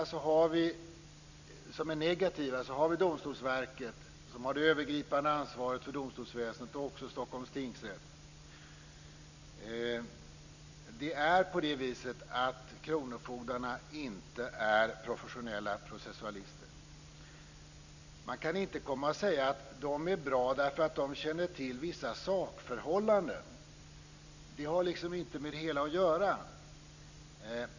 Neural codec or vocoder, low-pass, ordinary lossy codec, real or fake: none; 7.2 kHz; none; real